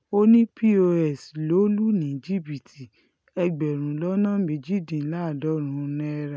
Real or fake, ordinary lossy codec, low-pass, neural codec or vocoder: real; none; none; none